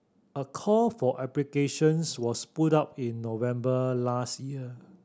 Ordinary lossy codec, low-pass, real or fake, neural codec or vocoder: none; none; real; none